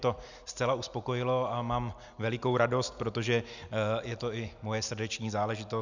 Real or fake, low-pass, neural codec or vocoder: real; 7.2 kHz; none